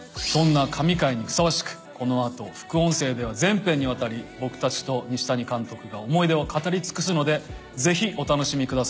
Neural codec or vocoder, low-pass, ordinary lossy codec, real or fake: none; none; none; real